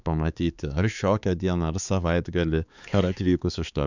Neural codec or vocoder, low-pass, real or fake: codec, 16 kHz, 4 kbps, X-Codec, HuBERT features, trained on balanced general audio; 7.2 kHz; fake